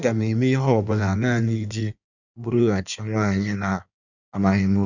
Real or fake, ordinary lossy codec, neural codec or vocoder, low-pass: fake; none; codec, 16 kHz in and 24 kHz out, 1.1 kbps, FireRedTTS-2 codec; 7.2 kHz